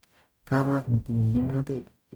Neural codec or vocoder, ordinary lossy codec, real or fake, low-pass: codec, 44.1 kHz, 0.9 kbps, DAC; none; fake; none